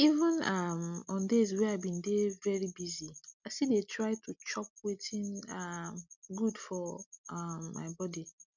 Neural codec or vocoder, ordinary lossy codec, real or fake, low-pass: none; none; real; 7.2 kHz